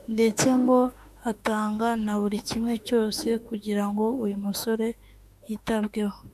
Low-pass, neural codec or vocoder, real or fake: 14.4 kHz; autoencoder, 48 kHz, 32 numbers a frame, DAC-VAE, trained on Japanese speech; fake